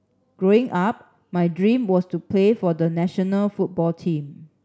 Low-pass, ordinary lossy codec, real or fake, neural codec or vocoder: none; none; real; none